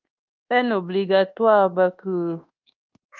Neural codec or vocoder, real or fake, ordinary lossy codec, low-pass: codec, 24 kHz, 1.2 kbps, DualCodec; fake; Opus, 32 kbps; 7.2 kHz